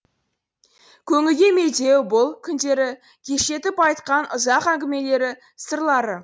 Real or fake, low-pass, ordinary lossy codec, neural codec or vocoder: real; none; none; none